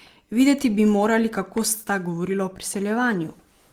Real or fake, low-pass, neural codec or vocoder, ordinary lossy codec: real; 19.8 kHz; none; Opus, 24 kbps